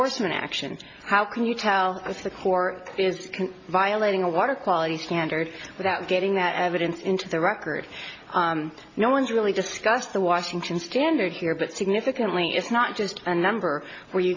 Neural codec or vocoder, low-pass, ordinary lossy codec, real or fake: none; 7.2 kHz; MP3, 32 kbps; real